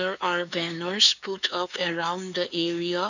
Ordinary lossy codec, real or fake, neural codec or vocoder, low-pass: none; fake; codec, 16 kHz, 4 kbps, X-Codec, HuBERT features, trained on LibriSpeech; 7.2 kHz